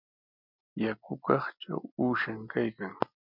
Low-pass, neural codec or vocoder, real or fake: 5.4 kHz; none; real